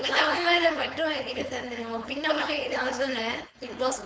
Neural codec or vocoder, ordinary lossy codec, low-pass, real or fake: codec, 16 kHz, 4.8 kbps, FACodec; none; none; fake